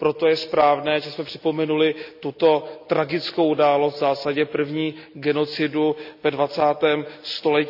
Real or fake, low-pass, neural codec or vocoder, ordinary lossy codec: real; 5.4 kHz; none; none